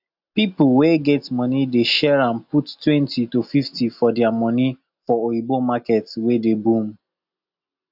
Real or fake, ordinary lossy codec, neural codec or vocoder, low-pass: real; AAC, 48 kbps; none; 5.4 kHz